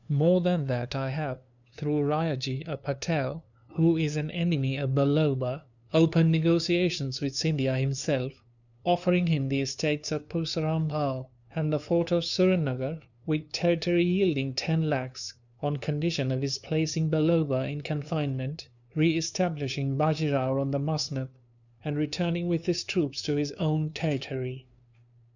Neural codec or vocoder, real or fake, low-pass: codec, 16 kHz, 2 kbps, FunCodec, trained on LibriTTS, 25 frames a second; fake; 7.2 kHz